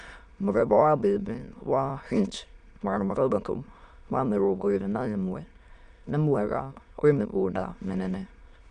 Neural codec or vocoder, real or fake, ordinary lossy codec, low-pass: autoencoder, 22.05 kHz, a latent of 192 numbers a frame, VITS, trained on many speakers; fake; none; 9.9 kHz